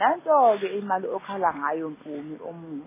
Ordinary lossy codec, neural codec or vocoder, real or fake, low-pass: MP3, 16 kbps; none; real; 3.6 kHz